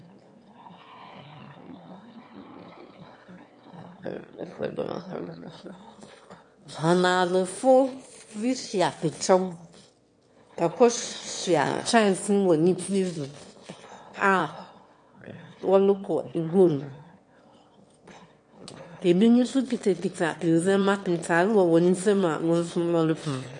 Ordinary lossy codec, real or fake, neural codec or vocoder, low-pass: MP3, 48 kbps; fake; autoencoder, 22.05 kHz, a latent of 192 numbers a frame, VITS, trained on one speaker; 9.9 kHz